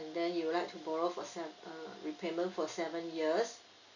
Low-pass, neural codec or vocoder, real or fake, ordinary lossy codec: 7.2 kHz; none; real; none